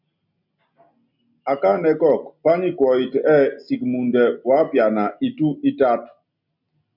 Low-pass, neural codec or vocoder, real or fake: 5.4 kHz; none; real